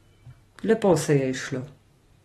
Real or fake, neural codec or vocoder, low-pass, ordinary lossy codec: real; none; 19.8 kHz; AAC, 32 kbps